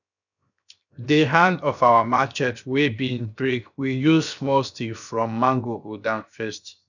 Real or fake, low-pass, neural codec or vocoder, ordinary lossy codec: fake; 7.2 kHz; codec, 16 kHz, 0.7 kbps, FocalCodec; none